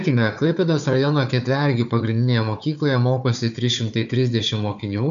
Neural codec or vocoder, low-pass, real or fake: codec, 16 kHz, 4 kbps, FunCodec, trained on Chinese and English, 50 frames a second; 7.2 kHz; fake